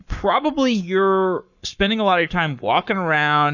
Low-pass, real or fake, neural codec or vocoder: 7.2 kHz; fake; codec, 44.1 kHz, 7.8 kbps, Pupu-Codec